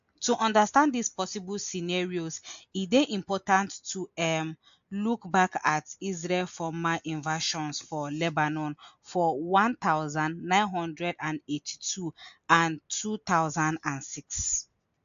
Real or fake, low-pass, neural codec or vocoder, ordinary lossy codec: real; 7.2 kHz; none; AAC, 64 kbps